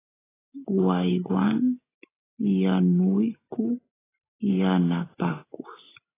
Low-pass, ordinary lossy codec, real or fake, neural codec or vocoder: 3.6 kHz; AAC, 16 kbps; real; none